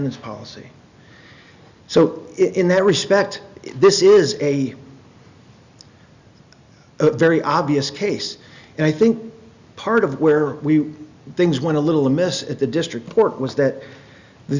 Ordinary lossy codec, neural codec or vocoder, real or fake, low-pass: Opus, 64 kbps; none; real; 7.2 kHz